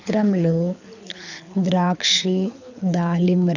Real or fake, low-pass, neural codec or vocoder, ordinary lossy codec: fake; 7.2 kHz; codec, 24 kHz, 6 kbps, HILCodec; none